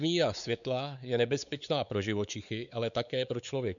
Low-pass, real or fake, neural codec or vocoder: 7.2 kHz; fake; codec, 16 kHz, 4 kbps, X-Codec, WavLM features, trained on Multilingual LibriSpeech